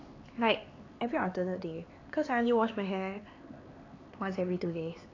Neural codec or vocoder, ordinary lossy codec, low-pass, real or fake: codec, 16 kHz, 4 kbps, X-Codec, HuBERT features, trained on LibriSpeech; AAC, 32 kbps; 7.2 kHz; fake